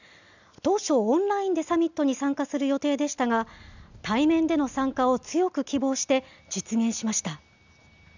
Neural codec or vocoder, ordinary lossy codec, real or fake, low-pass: none; none; real; 7.2 kHz